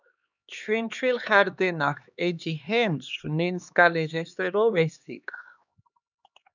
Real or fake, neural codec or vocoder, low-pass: fake; codec, 16 kHz, 2 kbps, X-Codec, HuBERT features, trained on LibriSpeech; 7.2 kHz